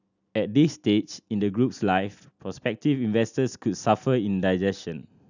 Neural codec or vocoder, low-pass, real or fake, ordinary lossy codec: none; 7.2 kHz; real; none